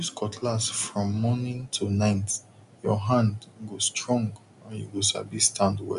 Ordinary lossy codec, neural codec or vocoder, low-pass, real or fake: none; none; 10.8 kHz; real